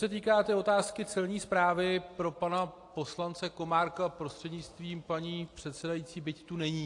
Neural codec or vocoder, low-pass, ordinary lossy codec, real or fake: none; 10.8 kHz; AAC, 48 kbps; real